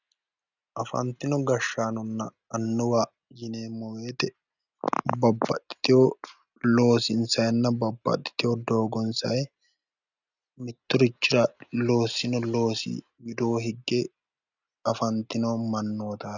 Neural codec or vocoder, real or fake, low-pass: none; real; 7.2 kHz